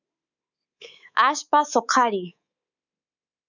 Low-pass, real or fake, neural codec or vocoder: 7.2 kHz; fake; codec, 24 kHz, 3.1 kbps, DualCodec